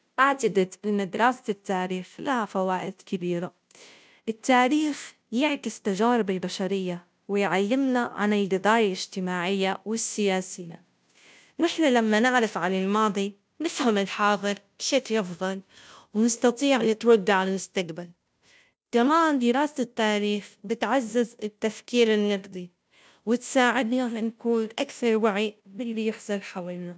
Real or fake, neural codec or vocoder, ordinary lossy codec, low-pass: fake; codec, 16 kHz, 0.5 kbps, FunCodec, trained on Chinese and English, 25 frames a second; none; none